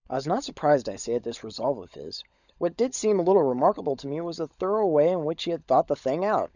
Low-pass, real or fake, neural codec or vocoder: 7.2 kHz; fake; codec, 16 kHz, 16 kbps, FunCodec, trained on LibriTTS, 50 frames a second